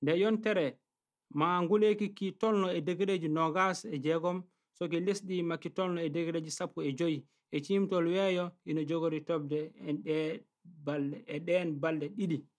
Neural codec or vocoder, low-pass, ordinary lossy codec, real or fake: none; none; none; real